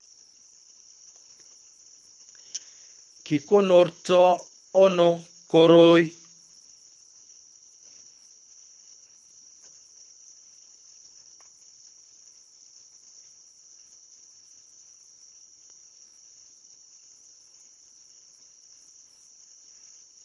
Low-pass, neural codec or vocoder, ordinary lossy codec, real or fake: none; codec, 24 kHz, 3 kbps, HILCodec; none; fake